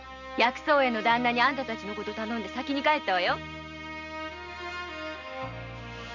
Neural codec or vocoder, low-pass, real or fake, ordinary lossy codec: none; 7.2 kHz; real; MP3, 64 kbps